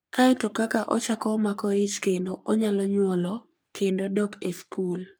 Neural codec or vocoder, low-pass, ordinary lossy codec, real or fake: codec, 44.1 kHz, 3.4 kbps, Pupu-Codec; none; none; fake